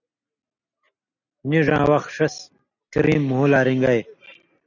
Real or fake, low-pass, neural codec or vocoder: real; 7.2 kHz; none